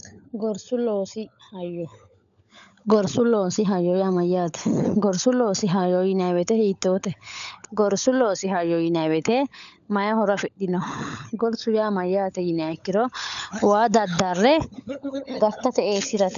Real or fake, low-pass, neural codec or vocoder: fake; 7.2 kHz; codec, 16 kHz, 16 kbps, FunCodec, trained on LibriTTS, 50 frames a second